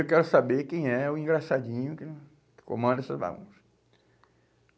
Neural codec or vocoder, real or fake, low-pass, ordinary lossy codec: none; real; none; none